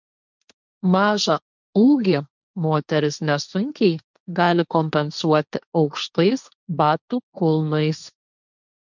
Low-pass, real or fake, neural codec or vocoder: 7.2 kHz; fake; codec, 16 kHz, 1.1 kbps, Voila-Tokenizer